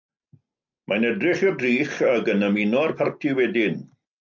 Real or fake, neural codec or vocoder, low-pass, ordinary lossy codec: real; none; 7.2 kHz; MP3, 64 kbps